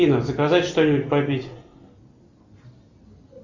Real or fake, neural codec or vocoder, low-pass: real; none; 7.2 kHz